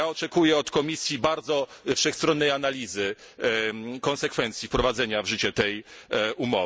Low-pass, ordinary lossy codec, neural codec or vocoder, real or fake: none; none; none; real